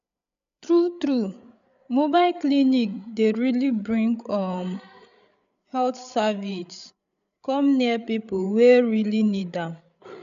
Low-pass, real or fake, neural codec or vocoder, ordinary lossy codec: 7.2 kHz; fake; codec, 16 kHz, 8 kbps, FreqCodec, larger model; none